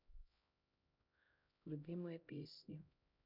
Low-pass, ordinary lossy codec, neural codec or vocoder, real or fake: 5.4 kHz; none; codec, 16 kHz, 0.5 kbps, X-Codec, HuBERT features, trained on LibriSpeech; fake